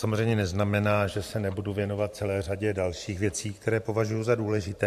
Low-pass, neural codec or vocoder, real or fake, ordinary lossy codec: 14.4 kHz; vocoder, 44.1 kHz, 128 mel bands every 512 samples, BigVGAN v2; fake; MP3, 64 kbps